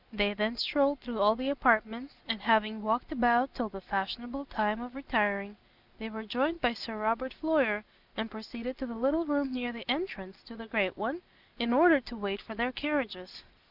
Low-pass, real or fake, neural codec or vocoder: 5.4 kHz; real; none